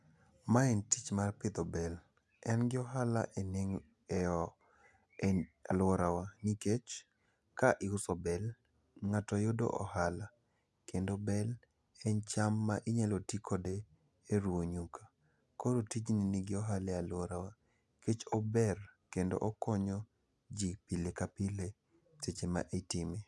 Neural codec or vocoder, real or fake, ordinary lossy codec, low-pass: none; real; none; none